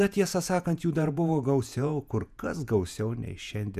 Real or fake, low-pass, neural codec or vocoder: fake; 14.4 kHz; vocoder, 48 kHz, 128 mel bands, Vocos